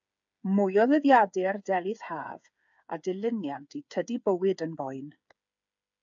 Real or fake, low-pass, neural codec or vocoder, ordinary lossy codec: fake; 7.2 kHz; codec, 16 kHz, 8 kbps, FreqCodec, smaller model; AAC, 64 kbps